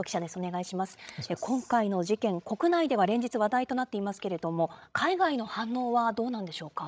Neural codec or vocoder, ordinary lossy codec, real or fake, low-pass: codec, 16 kHz, 16 kbps, FreqCodec, larger model; none; fake; none